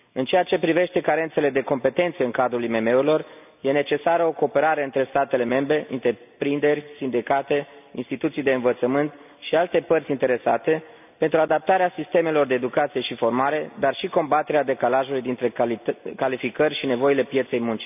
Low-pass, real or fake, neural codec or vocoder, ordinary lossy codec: 3.6 kHz; real; none; none